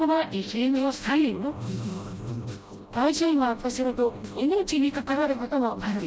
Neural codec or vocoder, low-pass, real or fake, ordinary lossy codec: codec, 16 kHz, 0.5 kbps, FreqCodec, smaller model; none; fake; none